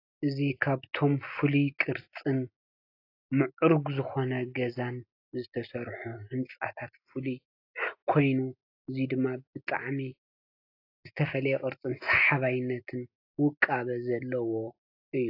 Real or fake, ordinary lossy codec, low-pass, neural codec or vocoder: real; AAC, 32 kbps; 5.4 kHz; none